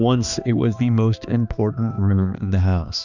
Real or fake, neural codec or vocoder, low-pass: fake; codec, 16 kHz, 2 kbps, X-Codec, HuBERT features, trained on balanced general audio; 7.2 kHz